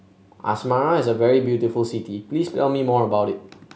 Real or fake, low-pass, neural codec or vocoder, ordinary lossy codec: real; none; none; none